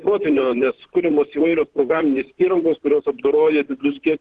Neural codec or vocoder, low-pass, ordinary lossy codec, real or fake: vocoder, 44.1 kHz, 128 mel bands, Pupu-Vocoder; 10.8 kHz; Opus, 16 kbps; fake